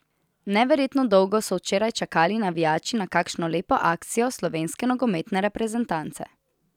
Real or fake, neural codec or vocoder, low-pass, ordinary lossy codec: real; none; 19.8 kHz; none